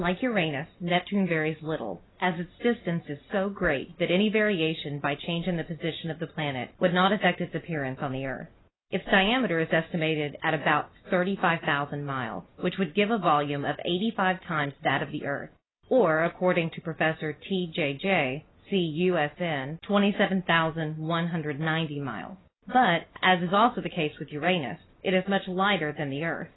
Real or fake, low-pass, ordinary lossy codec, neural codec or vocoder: real; 7.2 kHz; AAC, 16 kbps; none